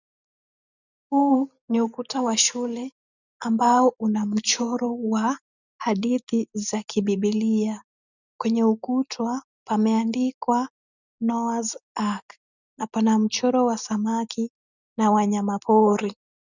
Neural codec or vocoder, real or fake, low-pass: none; real; 7.2 kHz